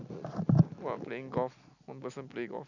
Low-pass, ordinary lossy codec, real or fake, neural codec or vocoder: 7.2 kHz; none; real; none